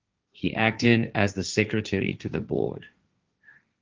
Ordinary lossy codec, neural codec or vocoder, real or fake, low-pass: Opus, 32 kbps; codec, 16 kHz, 1.1 kbps, Voila-Tokenizer; fake; 7.2 kHz